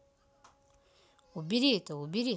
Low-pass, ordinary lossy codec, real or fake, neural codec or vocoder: none; none; real; none